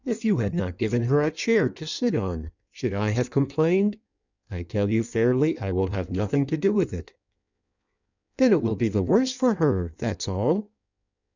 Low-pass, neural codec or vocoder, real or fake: 7.2 kHz; codec, 16 kHz in and 24 kHz out, 1.1 kbps, FireRedTTS-2 codec; fake